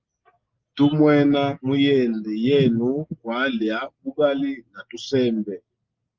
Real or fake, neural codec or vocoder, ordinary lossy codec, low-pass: real; none; Opus, 32 kbps; 7.2 kHz